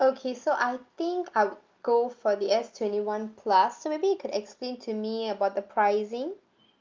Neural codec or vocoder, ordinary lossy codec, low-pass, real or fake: none; Opus, 24 kbps; 7.2 kHz; real